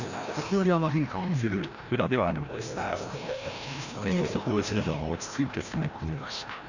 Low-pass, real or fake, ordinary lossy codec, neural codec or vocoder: 7.2 kHz; fake; none; codec, 16 kHz, 1 kbps, FreqCodec, larger model